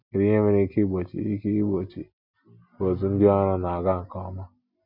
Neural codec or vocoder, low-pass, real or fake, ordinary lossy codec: none; 5.4 kHz; real; none